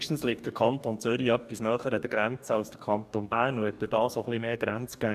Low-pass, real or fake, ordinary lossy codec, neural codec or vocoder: 14.4 kHz; fake; none; codec, 44.1 kHz, 2.6 kbps, DAC